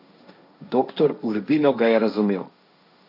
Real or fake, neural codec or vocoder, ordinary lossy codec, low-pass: fake; codec, 16 kHz, 1.1 kbps, Voila-Tokenizer; none; 5.4 kHz